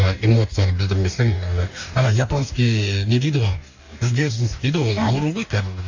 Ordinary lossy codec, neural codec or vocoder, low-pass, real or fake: AAC, 48 kbps; codec, 44.1 kHz, 2.6 kbps, DAC; 7.2 kHz; fake